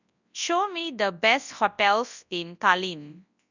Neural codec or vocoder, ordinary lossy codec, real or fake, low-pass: codec, 24 kHz, 0.9 kbps, WavTokenizer, large speech release; none; fake; 7.2 kHz